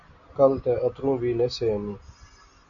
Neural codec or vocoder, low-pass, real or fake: none; 7.2 kHz; real